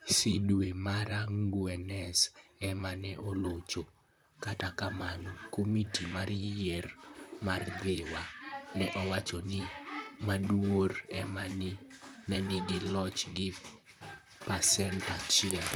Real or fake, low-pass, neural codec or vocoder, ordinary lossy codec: fake; none; vocoder, 44.1 kHz, 128 mel bands, Pupu-Vocoder; none